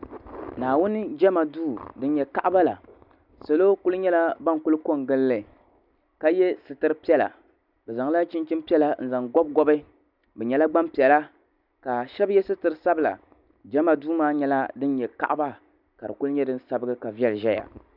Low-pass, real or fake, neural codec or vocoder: 5.4 kHz; real; none